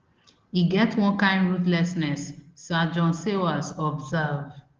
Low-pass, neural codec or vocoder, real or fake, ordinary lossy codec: 7.2 kHz; none; real; Opus, 16 kbps